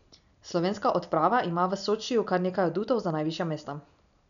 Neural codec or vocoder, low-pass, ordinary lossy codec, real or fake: none; 7.2 kHz; none; real